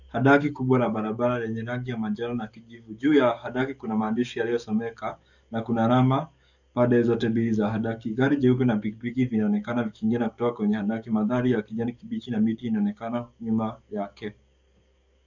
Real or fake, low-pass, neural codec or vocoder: fake; 7.2 kHz; codec, 44.1 kHz, 7.8 kbps, DAC